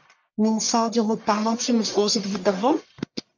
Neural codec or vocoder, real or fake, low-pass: codec, 44.1 kHz, 1.7 kbps, Pupu-Codec; fake; 7.2 kHz